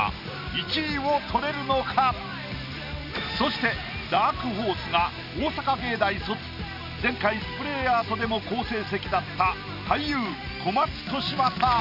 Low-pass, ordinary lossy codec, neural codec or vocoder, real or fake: 5.4 kHz; none; none; real